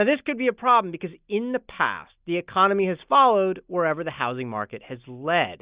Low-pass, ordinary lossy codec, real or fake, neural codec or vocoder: 3.6 kHz; Opus, 64 kbps; real; none